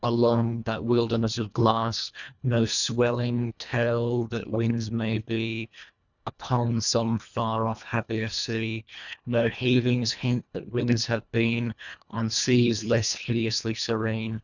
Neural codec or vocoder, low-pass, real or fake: codec, 24 kHz, 1.5 kbps, HILCodec; 7.2 kHz; fake